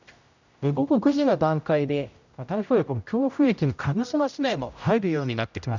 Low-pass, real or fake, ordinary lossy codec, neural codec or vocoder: 7.2 kHz; fake; none; codec, 16 kHz, 0.5 kbps, X-Codec, HuBERT features, trained on general audio